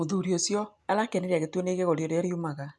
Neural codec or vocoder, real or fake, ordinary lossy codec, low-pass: none; real; none; none